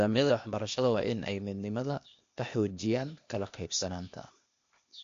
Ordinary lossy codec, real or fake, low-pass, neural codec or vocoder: MP3, 48 kbps; fake; 7.2 kHz; codec, 16 kHz, 0.8 kbps, ZipCodec